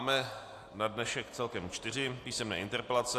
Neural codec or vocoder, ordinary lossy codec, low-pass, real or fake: none; AAC, 64 kbps; 14.4 kHz; real